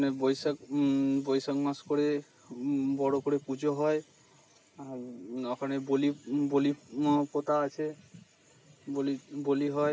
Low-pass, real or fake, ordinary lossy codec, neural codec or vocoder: none; real; none; none